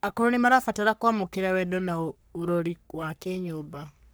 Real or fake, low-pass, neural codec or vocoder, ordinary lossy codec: fake; none; codec, 44.1 kHz, 3.4 kbps, Pupu-Codec; none